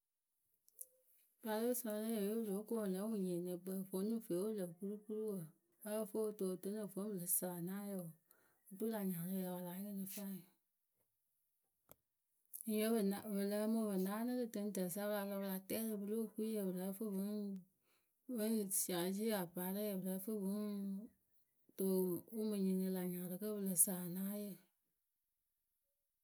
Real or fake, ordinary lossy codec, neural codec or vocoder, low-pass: real; none; none; none